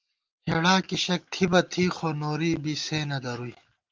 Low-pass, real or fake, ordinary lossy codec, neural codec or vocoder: 7.2 kHz; real; Opus, 24 kbps; none